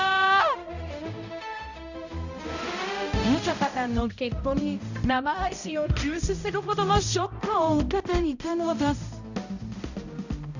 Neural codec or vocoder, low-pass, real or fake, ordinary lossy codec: codec, 16 kHz, 0.5 kbps, X-Codec, HuBERT features, trained on balanced general audio; 7.2 kHz; fake; none